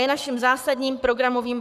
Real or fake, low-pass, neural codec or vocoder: fake; 14.4 kHz; codec, 44.1 kHz, 7.8 kbps, Pupu-Codec